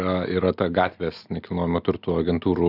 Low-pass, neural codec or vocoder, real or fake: 5.4 kHz; none; real